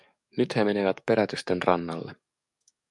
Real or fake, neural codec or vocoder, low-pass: fake; codec, 44.1 kHz, 7.8 kbps, DAC; 10.8 kHz